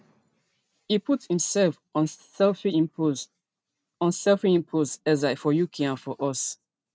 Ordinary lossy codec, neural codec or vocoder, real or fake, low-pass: none; none; real; none